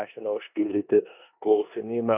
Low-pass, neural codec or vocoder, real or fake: 3.6 kHz; codec, 16 kHz in and 24 kHz out, 0.9 kbps, LongCat-Audio-Codec, four codebook decoder; fake